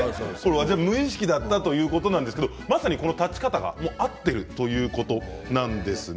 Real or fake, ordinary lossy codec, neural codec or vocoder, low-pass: real; none; none; none